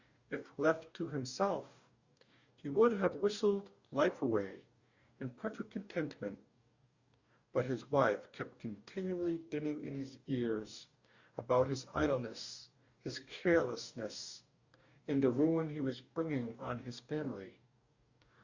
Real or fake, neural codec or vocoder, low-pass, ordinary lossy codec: fake; codec, 44.1 kHz, 2.6 kbps, DAC; 7.2 kHz; Opus, 64 kbps